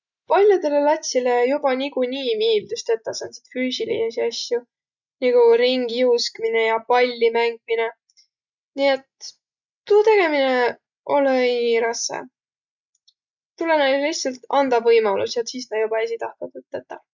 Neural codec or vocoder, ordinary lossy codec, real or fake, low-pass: none; none; real; 7.2 kHz